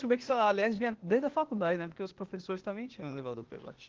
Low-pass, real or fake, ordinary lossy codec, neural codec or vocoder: 7.2 kHz; fake; Opus, 16 kbps; codec, 16 kHz, 0.8 kbps, ZipCodec